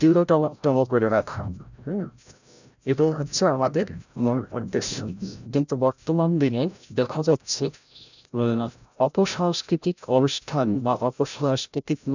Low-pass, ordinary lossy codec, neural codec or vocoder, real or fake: 7.2 kHz; none; codec, 16 kHz, 0.5 kbps, FreqCodec, larger model; fake